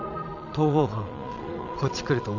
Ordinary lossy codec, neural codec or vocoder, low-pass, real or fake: none; vocoder, 22.05 kHz, 80 mel bands, Vocos; 7.2 kHz; fake